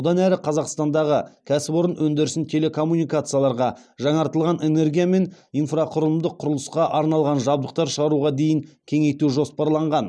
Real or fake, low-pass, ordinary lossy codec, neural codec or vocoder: real; none; none; none